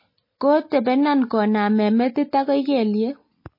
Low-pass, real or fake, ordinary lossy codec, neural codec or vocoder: 5.4 kHz; real; MP3, 24 kbps; none